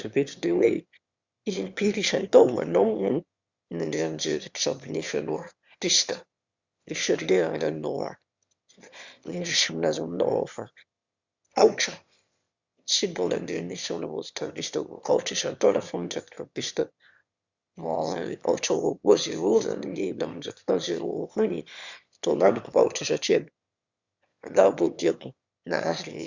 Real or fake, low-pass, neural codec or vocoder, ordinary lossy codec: fake; 7.2 kHz; autoencoder, 22.05 kHz, a latent of 192 numbers a frame, VITS, trained on one speaker; Opus, 64 kbps